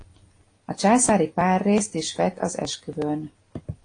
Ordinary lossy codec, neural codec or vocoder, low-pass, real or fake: AAC, 32 kbps; none; 9.9 kHz; real